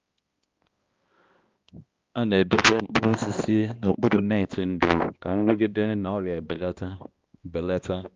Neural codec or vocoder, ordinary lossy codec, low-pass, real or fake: codec, 16 kHz, 1 kbps, X-Codec, HuBERT features, trained on balanced general audio; Opus, 32 kbps; 7.2 kHz; fake